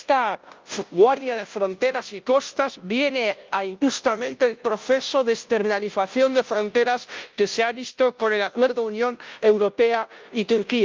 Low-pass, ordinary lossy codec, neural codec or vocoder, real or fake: 7.2 kHz; Opus, 24 kbps; codec, 16 kHz, 0.5 kbps, FunCodec, trained on Chinese and English, 25 frames a second; fake